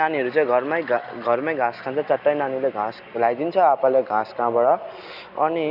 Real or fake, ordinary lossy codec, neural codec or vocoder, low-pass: real; Opus, 64 kbps; none; 5.4 kHz